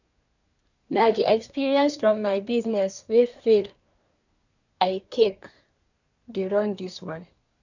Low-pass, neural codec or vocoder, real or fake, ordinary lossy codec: 7.2 kHz; codec, 24 kHz, 1 kbps, SNAC; fake; none